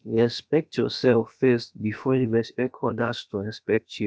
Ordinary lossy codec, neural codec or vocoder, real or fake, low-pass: none; codec, 16 kHz, about 1 kbps, DyCAST, with the encoder's durations; fake; none